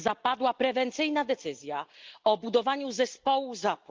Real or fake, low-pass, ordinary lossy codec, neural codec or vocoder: real; 7.2 kHz; Opus, 32 kbps; none